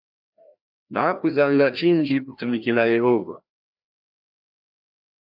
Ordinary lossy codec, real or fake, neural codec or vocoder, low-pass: AAC, 48 kbps; fake; codec, 16 kHz, 1 kbps, FreqCodec, larger model; 5.4 kHz